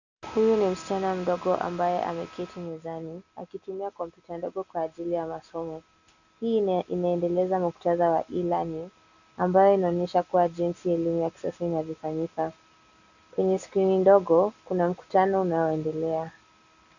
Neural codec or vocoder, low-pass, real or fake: none; 7.2 kHz; real